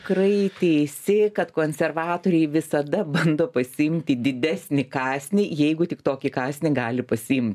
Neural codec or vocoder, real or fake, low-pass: none; real; 14.4 kHz